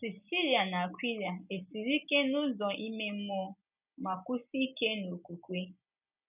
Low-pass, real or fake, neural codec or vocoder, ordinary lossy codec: 3.6 kHz; real; none; none